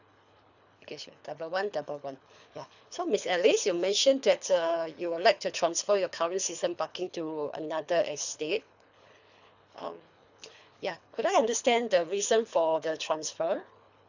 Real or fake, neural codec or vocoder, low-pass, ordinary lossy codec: fake; codec, 24 kHz, 3 kbps, HILCodec; 7.2 kHz; none